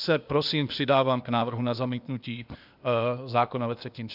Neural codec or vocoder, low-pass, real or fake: codec, 16 kHz, 0.8 kbps, ZipCodec; 5.4 kHz; fake